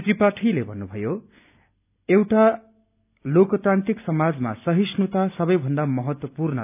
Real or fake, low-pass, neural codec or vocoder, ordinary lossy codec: real; 3.6 kHz; none; none